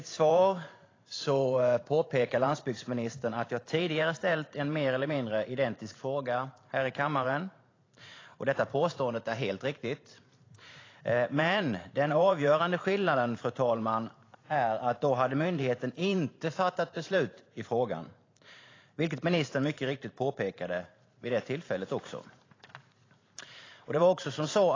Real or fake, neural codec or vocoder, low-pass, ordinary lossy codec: fake; vocoder, 44.1 kHz, 128 mel bands every 512 samples, BigVGAN v2; 7.2 kHz; AAC, 32 kbps